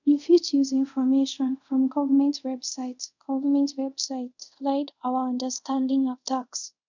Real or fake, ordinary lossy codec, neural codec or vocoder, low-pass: fake; none; codec, 24 kHz, 0.5 kbps, DualCodec; 7.2 kHz